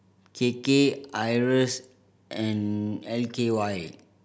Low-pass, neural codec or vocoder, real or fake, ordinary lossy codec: none; none; real; none